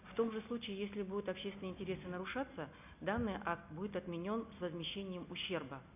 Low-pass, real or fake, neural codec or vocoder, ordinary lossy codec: 3.6 kHz; real; none; none